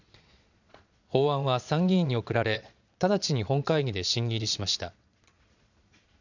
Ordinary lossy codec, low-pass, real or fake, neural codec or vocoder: none; 7.2 kHz; fake; vocoder, 22.05 kHz, 80 mel bands, Vocos